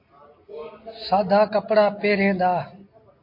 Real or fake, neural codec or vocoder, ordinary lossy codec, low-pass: real; none; MP3, 32 kbps; 5.4 kHz